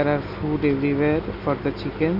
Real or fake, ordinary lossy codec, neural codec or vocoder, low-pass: real; none; none; 5.4 kHz